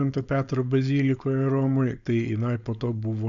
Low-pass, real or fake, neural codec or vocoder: 7.2 kHz; fake; codec, 16 kHz, 4.8 kbps, FACodec